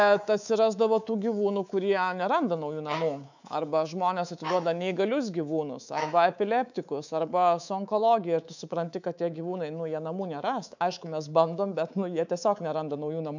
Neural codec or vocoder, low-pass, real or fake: codec, 24 kHz, 3.1 kbps, DualCodec; 7.2 kHz; fake